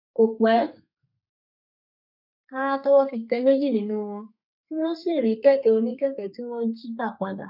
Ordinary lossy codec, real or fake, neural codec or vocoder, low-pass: none; fake; codec, 32 kHz, 1.9 kbps, SNAC; 5.4 kHz